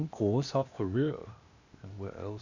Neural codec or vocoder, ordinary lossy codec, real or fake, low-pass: codec, 16 kHz, 0.8 kbps, ZipCodec; none; fake; 7.2 kHz